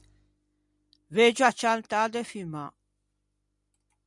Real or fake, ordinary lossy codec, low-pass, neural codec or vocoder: real; MP3, 96 kbps; 10.8 kHz; none